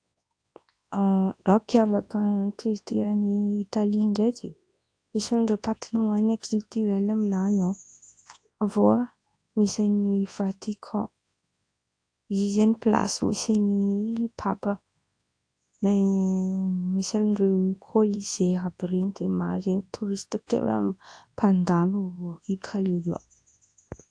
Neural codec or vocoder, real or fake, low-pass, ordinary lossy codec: codec, 24 kHz, 0.9 kbps, WavTokenizer, large speech release; fake; 9.9 kHz; AAC, 48 kbps